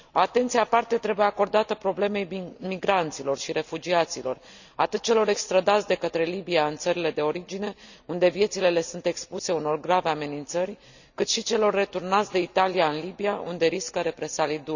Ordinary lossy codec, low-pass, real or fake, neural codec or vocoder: none; 7.2 kHz; real; none